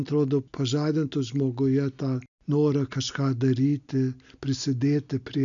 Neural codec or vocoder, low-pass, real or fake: none; 7.2 kHz; real